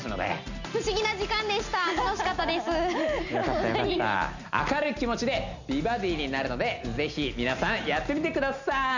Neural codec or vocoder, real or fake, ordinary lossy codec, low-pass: none; real; none; 7.2 kHz